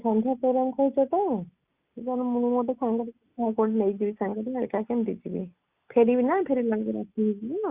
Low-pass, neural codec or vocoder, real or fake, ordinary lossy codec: 3.6 kHz; none; real; Opus, 64 kbps